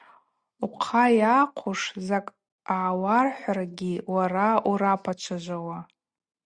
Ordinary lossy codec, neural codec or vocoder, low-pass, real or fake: Opus, 64 kbps; none; 9.9 kHz; real